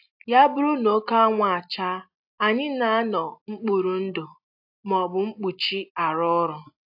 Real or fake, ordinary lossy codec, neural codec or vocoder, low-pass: real; AAC, 48 kbps; none; 5.4 kHz